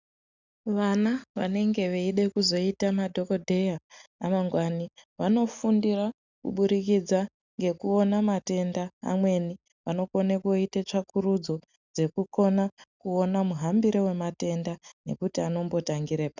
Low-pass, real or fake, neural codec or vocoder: 7.2 kHz; real; none